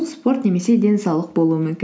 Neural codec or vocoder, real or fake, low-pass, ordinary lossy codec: none; real; none; none